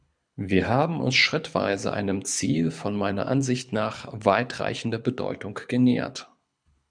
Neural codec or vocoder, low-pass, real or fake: codec, 24 kHz, 6 kbps, HILCodec; 9.9 kHz; fake